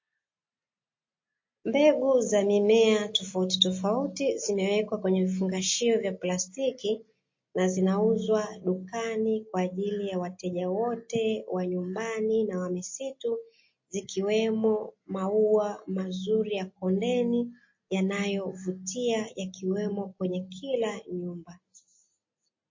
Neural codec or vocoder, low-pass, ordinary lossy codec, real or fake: none; 7.2 kHz; MP3, 32 kbps; real